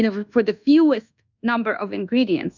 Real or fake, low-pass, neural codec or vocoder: fake; 7.2 kHz; codec, 24 kHz, 1.2 kbps, DualCodec